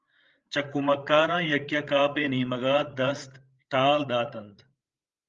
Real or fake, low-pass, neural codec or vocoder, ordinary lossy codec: fake; 7.2 kHz; codec, 16 kHz, 8 kbps, FreqCodec, larger model; Opus, 32 kbps